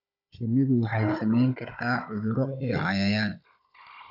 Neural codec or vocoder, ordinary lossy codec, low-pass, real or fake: codec, 16 kHz, 16 kbps, FunCodec, trained on Chinese and English, 50 frames a second; AAC, 48 kbps; 5.4 kHz; fake